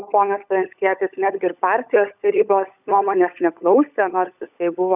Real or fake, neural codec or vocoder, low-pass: fake; codec, 16 kHz, 16 kbps, FunCodec, trained on LibriTTS, 50 frames a second; 3.6 kHz